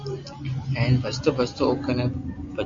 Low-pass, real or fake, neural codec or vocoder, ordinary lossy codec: 7.2 kHz; real; none; MP3, 48 kbps